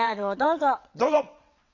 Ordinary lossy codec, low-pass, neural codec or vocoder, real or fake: none; 7.2 kHz; vocoder, 22.05 kHz, 80 mel bands, WaveNeXt; fake